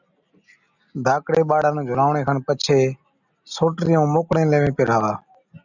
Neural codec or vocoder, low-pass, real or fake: none; 7.2 kHz; real